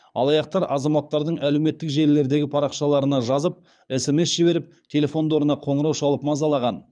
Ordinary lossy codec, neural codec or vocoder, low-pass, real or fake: none; codec, 24 kHz, 6 kbps, HILCodec; 9.9 kHz; fake